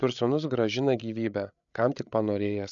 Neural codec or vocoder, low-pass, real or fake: codec, 16 kHz, 8 kbps, FreqCodec, larger model; 7.2 kHz; fake